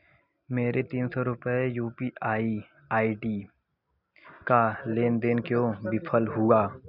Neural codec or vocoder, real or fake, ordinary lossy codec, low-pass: none; real; none; 5.4 kHz